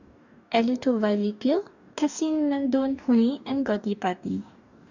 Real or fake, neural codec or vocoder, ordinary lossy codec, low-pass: fake; codec, 44.1 kHz, 2.6 kbps, DAC; none; 7.2 kHz